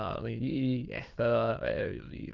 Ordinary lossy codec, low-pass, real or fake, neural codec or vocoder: Opus, 24 kbps; 7.2 kHz; fake; autoencoder, 22.05 kHz, a latent of 192 numbers a frame, VITS, trained on many speakers